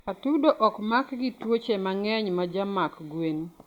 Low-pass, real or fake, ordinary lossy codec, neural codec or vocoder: 19.8 kHz; real; Opus, 64 kbps; none